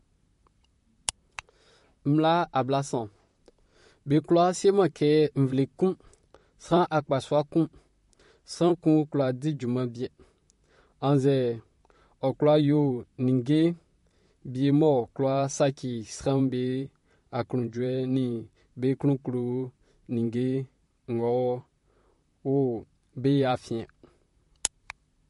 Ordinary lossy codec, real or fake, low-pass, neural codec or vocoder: MP3, 48 kbps; fake; 14.4 kHz; vocoder, 44.1 kHz, 128 mel bands, Pupu-Vocoder